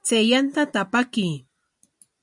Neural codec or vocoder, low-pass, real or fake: none; 10.8 kHz; real